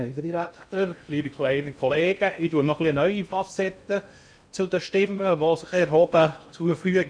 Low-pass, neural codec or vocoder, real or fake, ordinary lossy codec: 9.9 kHz; codec, 16 kHz in and 24 kHz out, 0.6 kbps, FocalCodec, streaming, 2048 codes; fake; none